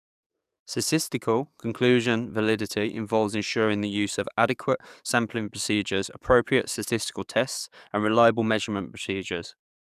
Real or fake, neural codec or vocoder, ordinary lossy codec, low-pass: fake; codec, 44.1 kHz, 7.8 kbps, DAC; none; 14.4 kHz